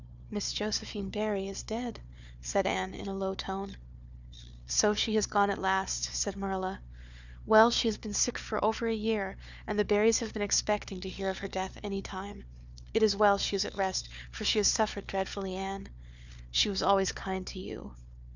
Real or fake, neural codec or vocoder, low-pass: fake; codec, 16 kHz, 4 kbps, FunCodec, trained on Chinese and English, 50 frames a second; 7.2 kHz